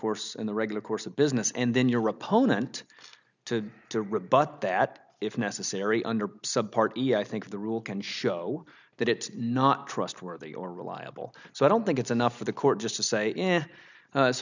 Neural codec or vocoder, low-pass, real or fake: none; 7.2 kHz; real